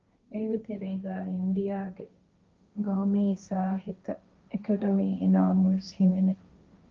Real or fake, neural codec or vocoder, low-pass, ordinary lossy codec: fake; codec, 16 kHz, 1.1 kbps, Voila-Tokenizer; 7.2 kHz; Opus, 24 kbps